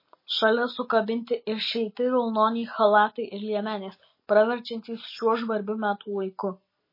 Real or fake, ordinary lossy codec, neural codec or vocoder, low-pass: real; MP3, 24 kbps; none; 5.4 kHz